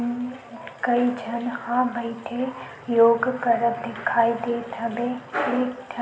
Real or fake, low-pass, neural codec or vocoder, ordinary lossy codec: real; none; none; none